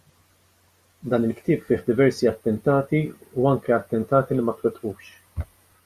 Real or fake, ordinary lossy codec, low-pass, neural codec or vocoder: real; Opus, 64 kbps; 14.4 kHz; none